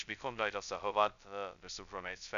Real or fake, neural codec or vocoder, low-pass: fake; codec, 16 kHz, 0.2 kbps, FocalCodec; 7.2 kHz